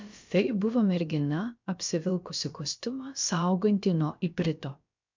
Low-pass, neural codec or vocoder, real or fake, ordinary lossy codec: 7.2 kHz; codec, 16 kHz, about 1 kbps, DyCAST, with the encoder's durations; fake; MP3, 64 kbps